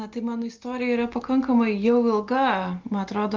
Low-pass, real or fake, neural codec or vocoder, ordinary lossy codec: 7.2 kHz; real; none; Opus, 32 kbps